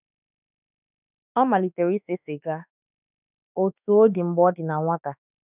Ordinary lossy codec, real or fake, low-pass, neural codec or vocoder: none; fake; 3.6 kHz; autoencoder, 48 kHz, 32 numbers a frame, DAC-VAE, trained on Japanese speech